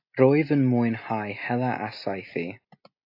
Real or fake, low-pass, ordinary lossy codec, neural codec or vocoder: real; 5.4 kHz; AAC, 32 kbps; none